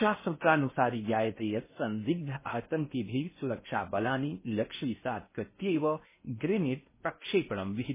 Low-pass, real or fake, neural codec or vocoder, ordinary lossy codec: 3.6 kHz; fake; codec, 16 kHz in and 24 kHz out, 0.6 kbps, FocalCodec, streaming, 4096 codes; MP3, 16 kbps